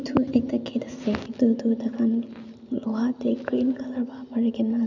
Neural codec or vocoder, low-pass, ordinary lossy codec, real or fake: none; 7.2 kHz; none; real